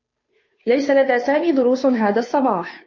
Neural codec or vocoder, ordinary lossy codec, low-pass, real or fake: codec, 16 kHz, 2 kbps, FunCodec, trained on Chinese and English, 25 frames a second; MP3, 32 kbps; 7.2 kHz; fake